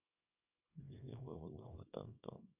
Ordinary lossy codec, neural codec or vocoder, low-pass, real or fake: none; codec, 24 kHz, 0.9 kbps, WavTokenizer, small release; 3.6 kHz; fake